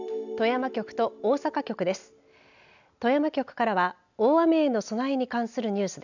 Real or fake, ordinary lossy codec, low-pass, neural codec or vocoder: real; none; 7.2 kHz; none